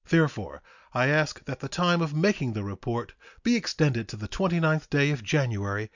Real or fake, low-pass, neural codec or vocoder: real; 7.2 kHz; none